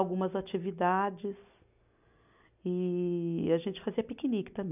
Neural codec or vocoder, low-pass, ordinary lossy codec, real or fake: none; 3.6 kHz; none; real